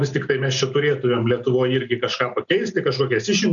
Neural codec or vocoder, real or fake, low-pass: none; real; 7.2 kHz